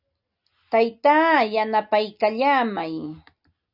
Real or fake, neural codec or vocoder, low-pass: real; none; 5.4 kHz